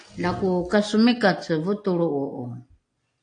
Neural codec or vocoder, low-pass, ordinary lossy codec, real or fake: none; 9.9 kHz; AAC, 48 kbps; real